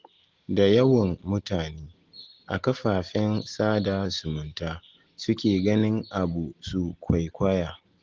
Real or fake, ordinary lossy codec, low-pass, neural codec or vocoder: real; Opus, 16 kbps; 7.2 kHz; none